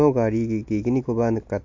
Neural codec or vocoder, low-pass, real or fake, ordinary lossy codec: none; 7.2 kHz; real; MP3, 48 kbps